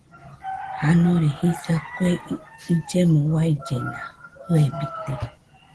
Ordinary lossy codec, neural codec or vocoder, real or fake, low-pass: Opus, 16 kbps; none; real; 10.8 kHz